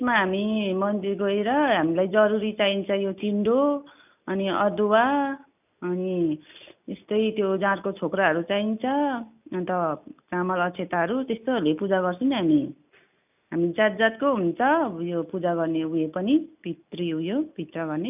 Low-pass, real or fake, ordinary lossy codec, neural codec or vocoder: 3.6 kHz; real; none; none